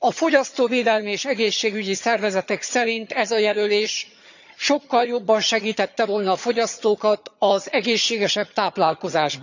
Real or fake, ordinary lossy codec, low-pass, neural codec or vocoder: fake; none; 7.2 kHz; vocoder, 22.05 kHz, 80 mel bands, HiFi-GAN